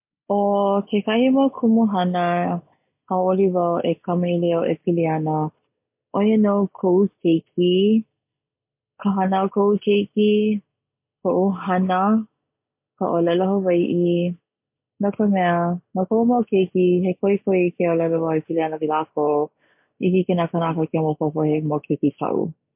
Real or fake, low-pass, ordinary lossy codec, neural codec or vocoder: real; 3.6 kHz; MP3, 32 kbps; none